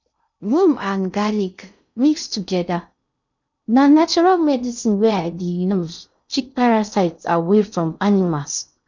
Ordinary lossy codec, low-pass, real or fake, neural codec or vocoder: none; 7.2 kHz; fake; codec, 16 kHz in and 24 kHz out, 0.6 kbps, FocalCodec, streaming, 2048 codes